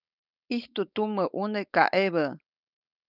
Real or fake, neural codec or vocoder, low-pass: fake; codec, 16 kHz, 4.8 kbps, FACodec; 5.4 kHz